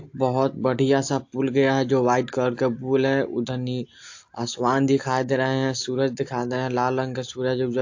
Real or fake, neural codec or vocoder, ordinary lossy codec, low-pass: real; none; AAC, 48 kbps; 7.2 kHz